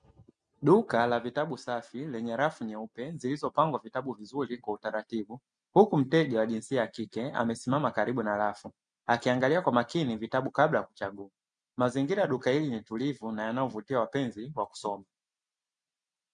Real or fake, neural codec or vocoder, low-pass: real; none; 9.9 kHz